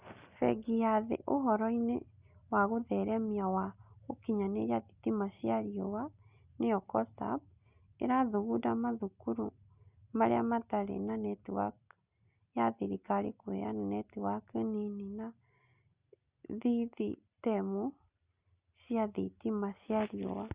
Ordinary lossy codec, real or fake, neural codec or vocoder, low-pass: Opus, 64 kbps; real; none; 3.6 kHz